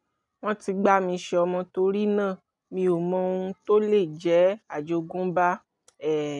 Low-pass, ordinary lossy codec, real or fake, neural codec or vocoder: 10.8 kHz; none; real; none